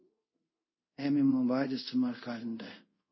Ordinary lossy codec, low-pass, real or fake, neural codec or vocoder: MP3, 24 kbps; 7.2 kHz; fake; codec, 24 kHz, 0.5 kbps, DualCodec